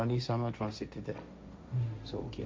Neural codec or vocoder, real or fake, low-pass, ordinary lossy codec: codec, 16 kHz, 1.1 kbps, Voila-Tokenizer; fake; none; none